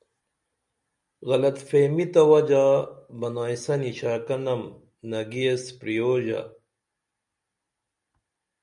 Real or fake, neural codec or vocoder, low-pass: real; none; 10.8 kHz